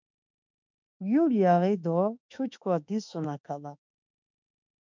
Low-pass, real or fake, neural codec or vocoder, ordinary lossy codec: 7.2 kHz; fake; autoencoder, 48 kHz, 32 numbers a frame, DAC-VAE, trained on Japanese speech; MP3, 64 kbps